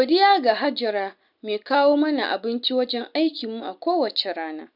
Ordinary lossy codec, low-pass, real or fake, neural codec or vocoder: none; 5.4 kHz; real; none